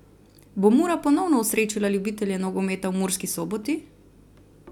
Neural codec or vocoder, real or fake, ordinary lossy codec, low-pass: none; real; none; 19.8 kHz